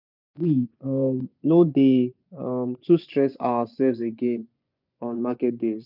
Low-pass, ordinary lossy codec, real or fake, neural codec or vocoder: 5.4 kHz; none; fake; vocoder, 24 kHz, 100 mel bands, Vocos